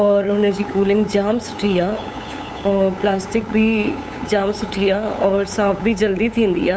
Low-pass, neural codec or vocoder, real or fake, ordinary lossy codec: none; codec, 16 kHz, 8 kbps, FunCodec, trained on LibriTTS, 25 frames a second; fake; none